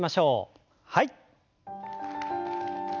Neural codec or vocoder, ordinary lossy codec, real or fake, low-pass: none; none; real; 7.2 kHz